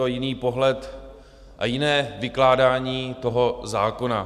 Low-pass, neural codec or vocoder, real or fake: 14.4 kHz; none; real